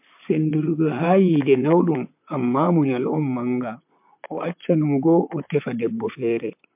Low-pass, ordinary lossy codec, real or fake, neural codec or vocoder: 3.6 kHz; MP3, 32 kbps; fake; vocoder, 44.1 kHz, 128 mel bands, Pupu-Vocoder